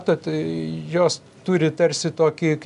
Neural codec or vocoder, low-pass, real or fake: none; 10.8 kHz; real